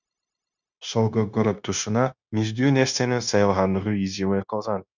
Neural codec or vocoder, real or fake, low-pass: codec, 16 kHz, 0.9 kbps, LongCat-Audio-Codec; fake; 7.2 kHz